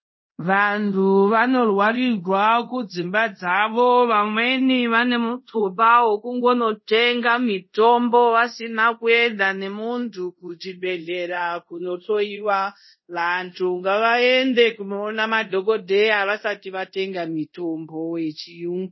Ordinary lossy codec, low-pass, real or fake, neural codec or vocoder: MP3, 24 kbps; 7.2 kHz; fake; codec, 24 kHz, 0.5 kbps, DualCodec